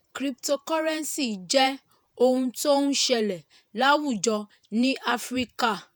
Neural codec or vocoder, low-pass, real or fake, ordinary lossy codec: vocoder, 48 kHz, 128 mel bands, Vocos; none; fake; none